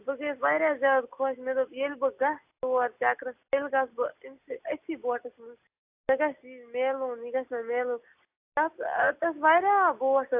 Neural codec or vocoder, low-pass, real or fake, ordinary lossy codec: none; 3.6 kHz; real; AAC, 32 kbps